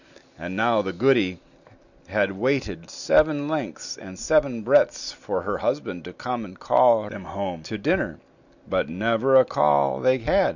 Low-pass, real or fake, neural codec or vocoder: 7.2 kHz; real; none